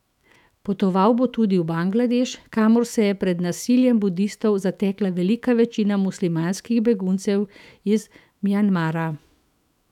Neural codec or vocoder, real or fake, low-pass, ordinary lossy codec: autoencoder, 48 kHz, 128 numbers a frame, DAC-VAE, trained on Japanese speech; fake; 19.8 kHz; none